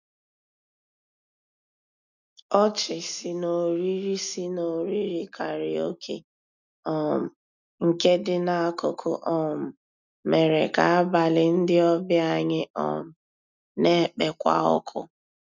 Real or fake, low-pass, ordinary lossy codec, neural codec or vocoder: real; 7.2 kHz; none; none